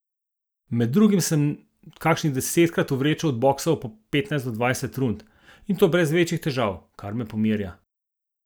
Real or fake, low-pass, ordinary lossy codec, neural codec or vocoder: real; none; none; none